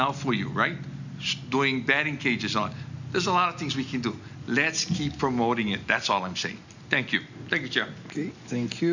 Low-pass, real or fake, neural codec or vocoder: 7.2 kHz; real; none